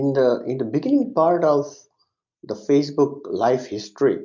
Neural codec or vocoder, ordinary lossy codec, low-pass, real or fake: none; MP3, 64 kbps; 7.2 kHz; real